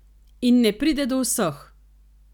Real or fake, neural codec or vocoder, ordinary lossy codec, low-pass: real; none; none; 19.8 kHz